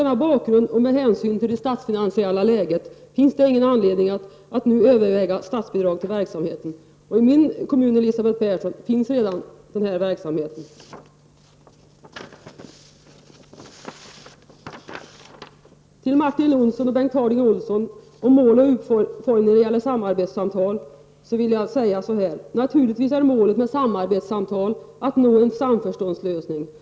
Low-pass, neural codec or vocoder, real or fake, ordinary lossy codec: none; none; real; none